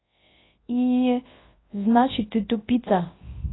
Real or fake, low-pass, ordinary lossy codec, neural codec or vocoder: fake; 7.2 kHz; AAC, 16 kbps; codec, 24 kHz, 0.9 kbps, WavTokenizer, large speech release